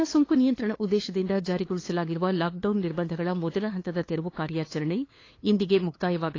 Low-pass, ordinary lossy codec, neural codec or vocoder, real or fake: 7.2 kHz; AAC, 32 kbps; codec, 16 kHz, 2 kbps, FunCodec, trained on LibriTTS, 25 frames a second; fake